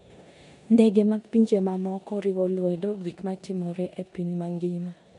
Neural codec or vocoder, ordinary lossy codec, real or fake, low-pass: codec, 16 kHz in and 24 kHz out, 0.9 kbps, LongCat-Audio-Codec, four codebook decoder; none; fake; 10.8 kHz